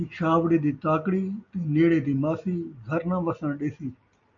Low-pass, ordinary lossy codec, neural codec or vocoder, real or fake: 7.2 kHz; MP3, 96 kbps; none; real